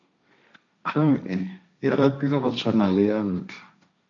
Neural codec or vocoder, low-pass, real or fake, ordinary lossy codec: codec, 16 kHz, 1.1 kbps, Voila-Tokenizer; 7.2 kHz; fake; MP3, 96 kbps